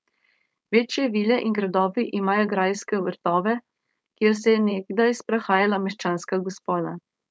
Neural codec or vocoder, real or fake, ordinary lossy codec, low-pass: codec, 16 kHz, 4.8 kbps, FACodec; fake; none; none